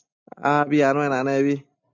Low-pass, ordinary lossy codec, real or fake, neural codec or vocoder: 7.2 kHz; MP3, 64 kbps; real; none